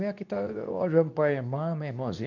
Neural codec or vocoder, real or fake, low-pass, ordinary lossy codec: codec, 24 kHz, 0.9 kbps, WavTokenizer, medium speech release version 2; fake; 7.2 kHz; none